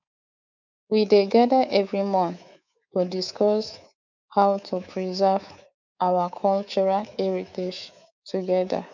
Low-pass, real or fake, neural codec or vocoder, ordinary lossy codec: 7.2 kHz; fake; codec, 24 kHz, 3.1 kbps, DualCodec; none